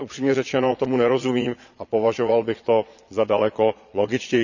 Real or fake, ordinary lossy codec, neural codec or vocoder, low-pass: fake; none; vocoder, 22.05 kHz, 80 mel bands, Vocos; 7.2 kHz